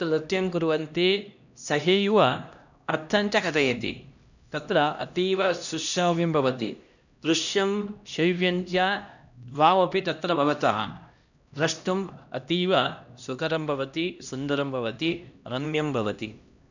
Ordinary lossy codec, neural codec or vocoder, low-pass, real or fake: none; codec, 16 kHz, 1 kbps, X-Codec, HuBERT features, trained on LibriSpeech; 7.2 kHz; fake